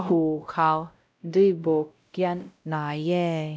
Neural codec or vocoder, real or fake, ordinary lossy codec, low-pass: codec, 16 kHz, 0.5 kbps, X-Codec, WavLM features, trained on Multilingual LibriSpeech; fake; none; none